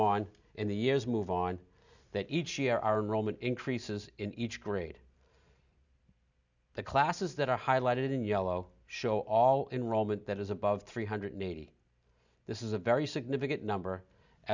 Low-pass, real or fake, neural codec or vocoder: 7.2 kHz; real; none